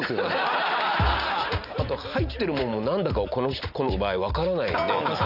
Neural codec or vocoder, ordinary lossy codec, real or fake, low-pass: none; none; real; 5.4 kHz